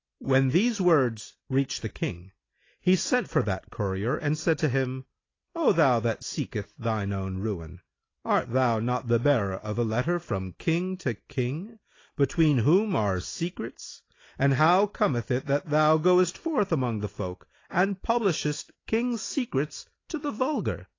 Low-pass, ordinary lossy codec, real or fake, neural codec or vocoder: 7.2 kHz; AAC, 32 kbps; real; none